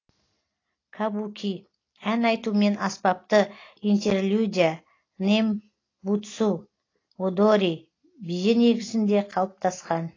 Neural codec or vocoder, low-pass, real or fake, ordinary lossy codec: none; 7.2 kHz; real; AAC, 32 kbps